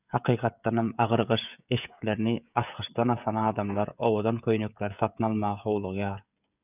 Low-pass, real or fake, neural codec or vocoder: 3.6 kHz; fake; codec, 16 kHz, 16 kbps, FreqCodec, smaller model